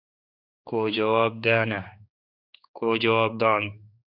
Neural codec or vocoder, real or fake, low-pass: codec, 16 kHz, 4 kbps, X-Codec, HuBERT features, trained on general audio; fake; 5.4 kHz